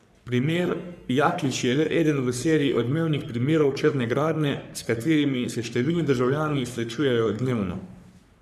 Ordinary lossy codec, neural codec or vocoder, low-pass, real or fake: none; codec, 44.1 kHz, 3.4 kbps, Pupu-Codec; 14.4 kHz; fake